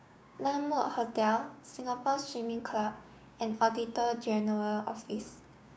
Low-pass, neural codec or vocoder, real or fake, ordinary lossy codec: none; codec, 16 kHz, 6 kbps, DAC; fake; none